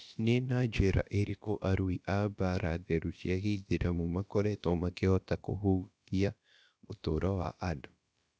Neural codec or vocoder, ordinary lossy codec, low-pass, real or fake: codec, 16 kHz, about 1 kbps, DyCAST, with the encoder's durations; none; none; fake